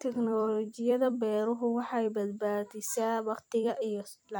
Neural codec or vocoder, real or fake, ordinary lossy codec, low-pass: vocoder, 44.1 kHz, 128 mel bands every 256 samples, BigVGAN v2; fake; none; none